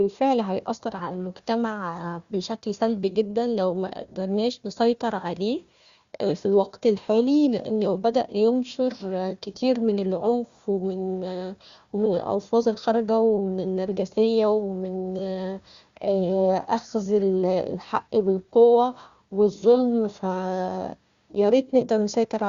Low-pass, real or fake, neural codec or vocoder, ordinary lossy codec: 7.2 kHz; fake; codec, 16 kHz, 1 kbps, FunCodec, trained on Chinese and English, 50 frames a second; Opus, 64 kbps